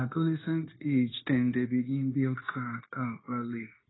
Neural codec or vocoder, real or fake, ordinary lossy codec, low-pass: codec, 16 kHz, 0.9 kbps, LongCat-Audio-Codec; fake; AAC, 16 kbps; 7.2 kHz